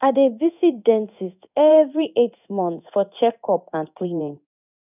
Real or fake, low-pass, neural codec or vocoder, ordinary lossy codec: fake; 3.6 kHz; codec, 16 kHz in and 24 kHz out, 1 kbps, XY-Tokenizer; none